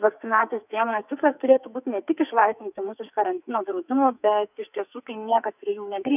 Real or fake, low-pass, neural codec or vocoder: fake; 3.6 kHz; codec, 44.1 kHz, 2.6 kbps, SNAC